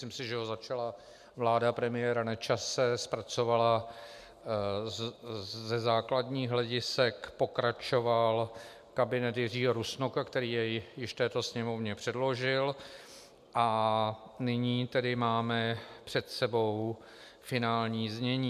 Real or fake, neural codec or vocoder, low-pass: real; none; 14.4 kHz